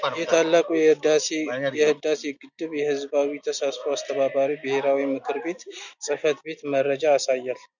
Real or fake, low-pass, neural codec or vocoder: real; 7.2 kHz; none